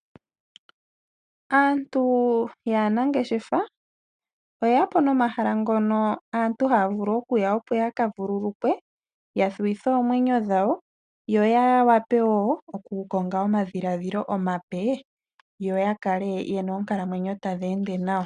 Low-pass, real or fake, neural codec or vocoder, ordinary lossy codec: 9.9 kHz; real; none; MP3, 96 kbps